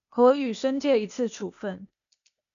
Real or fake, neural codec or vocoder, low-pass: fake; codec, 16 kHz, 0.8 kbps, ZipCodec; 7.2 kHz